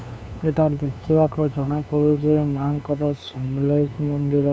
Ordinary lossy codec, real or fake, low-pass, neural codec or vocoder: none; fake; none; codec, 16 kHz, 2 kbps, FunCodec, trained on LibriTTS, 25 frames a second